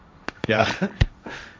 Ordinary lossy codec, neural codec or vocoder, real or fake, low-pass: none; codec, 16 kHz, 1.1 kbps, Voila-Tokenizer; fake; none